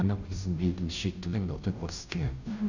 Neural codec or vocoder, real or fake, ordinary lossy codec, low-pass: codec, 16 kHz, 0.5 kbps, FunCodec, trained on Chinese and English, 25 frames a second; fake; none; 7.2 kHz